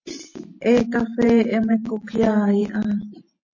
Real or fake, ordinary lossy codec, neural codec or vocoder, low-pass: fake; MP3, 32 kbps; vocoder, 44.1 kHz, 128 mel bands every 512 samples, BigVGAN v2; 7.2 kHz